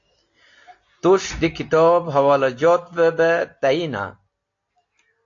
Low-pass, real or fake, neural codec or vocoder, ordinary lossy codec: 7.2 kHz; real; none; AAC, 48 kbps